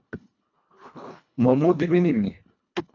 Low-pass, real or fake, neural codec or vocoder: 7.2 kHz; fake; codec, 24 kHz, 1.5 kbps, HILCodec